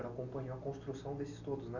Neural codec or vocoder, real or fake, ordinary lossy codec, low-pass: none; real; none; 7.2 kHz